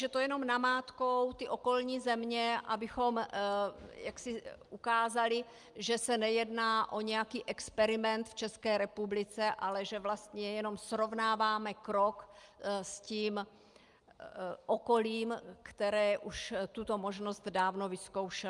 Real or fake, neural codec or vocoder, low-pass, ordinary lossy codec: real; none; 10.8 kHz; Opus, 32 kbps